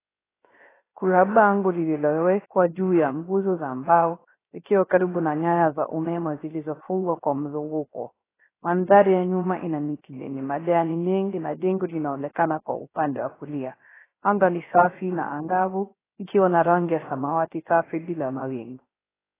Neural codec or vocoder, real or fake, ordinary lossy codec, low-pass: codec, 16 kHz, 0.3 kbps, FocalCodec; fake; AAC, 16 kbps; 3.6 kHz